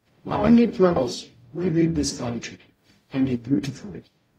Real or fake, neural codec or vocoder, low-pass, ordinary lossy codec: fake; codec, 44.1 kHz, 0.9 kbps, DAC; 19.8 kHz; AAC, 48 kbps